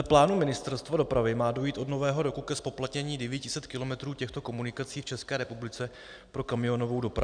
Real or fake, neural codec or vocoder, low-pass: real; none; 9.9 kHz